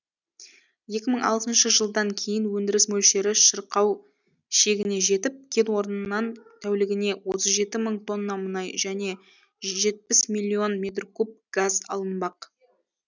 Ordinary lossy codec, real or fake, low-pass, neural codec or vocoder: none; real; 7.2 kHz; none